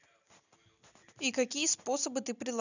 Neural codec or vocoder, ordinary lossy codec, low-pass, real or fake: none; none; 7.2 kHz; real